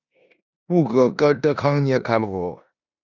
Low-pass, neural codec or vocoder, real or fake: 7.2 kHz; codec, 16 kHz in and 24 kHz out, 0.9 kbps, LongCat-Audio-Codec, four codebook decoder; fake